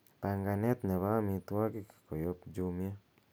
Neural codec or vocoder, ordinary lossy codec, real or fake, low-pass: none; none; real; none